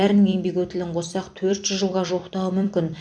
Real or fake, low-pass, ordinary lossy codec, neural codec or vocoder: fake; 9.9 kHz; MP3, 64 kbps; vocoder, 44.1 kHz, 128 mel bands every 256 samples, BigVGAN v2